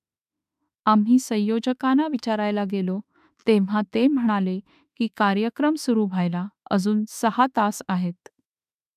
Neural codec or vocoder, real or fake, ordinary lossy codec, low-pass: autoencoder, 48 kHz, 32 numbers a frame, DAC-VAE, trained on Japanese speech; fake; none; 14.4 kHz